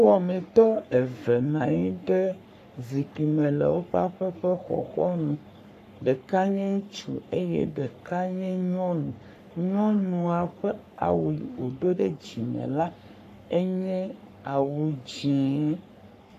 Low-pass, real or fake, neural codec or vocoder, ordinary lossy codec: 14.4 kHz; fake; codec, 44.1 kHz, 3.4 kbps, Pupu-Codec; AAC, 96 kbps